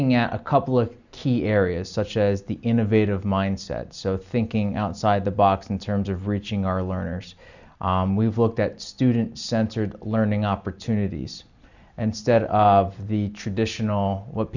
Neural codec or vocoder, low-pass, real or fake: none; 7.2 kHz; real